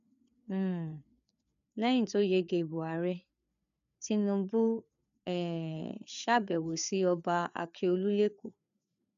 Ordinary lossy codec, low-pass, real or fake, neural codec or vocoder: none; 7.2 kHz; fake; codec, 16 kHz, 4 kbps, FreqCodec, larger model